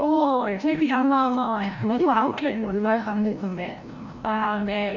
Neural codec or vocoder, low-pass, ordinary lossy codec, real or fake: codec, 16 kHz, 0.5 kbps, FreqCodec, larger model; 7.2 kHz; none; fake